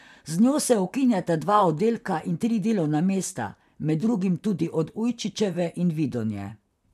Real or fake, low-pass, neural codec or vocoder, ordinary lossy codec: fake; 14.4 kHz; vocoder, 44.1 kHz, 128 mel bands, Pupu-Vocoder; none